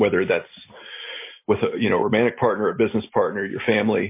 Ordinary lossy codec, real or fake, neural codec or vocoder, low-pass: MP3, 24 kbps; real; none; 3.6 kHz